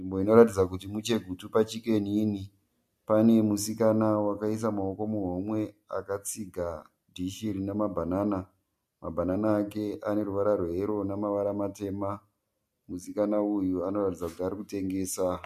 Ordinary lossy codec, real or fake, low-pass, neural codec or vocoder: MP3, 64 kbps; real; 14.4 kHz; none